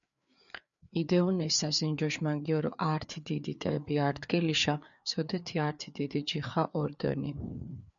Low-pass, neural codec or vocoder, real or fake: 7.2 kHz; codec, 16 kHz, 4 kbps, FreqCodec, larger model; fake